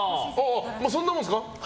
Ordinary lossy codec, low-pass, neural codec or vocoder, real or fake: none; none; none; real